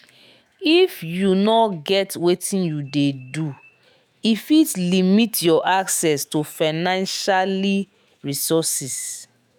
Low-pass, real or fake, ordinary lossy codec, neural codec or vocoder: none; fake; none; autoencoder, 48 kHz, 128 numbers a frame, DAC-VAE, trained on Japanese speech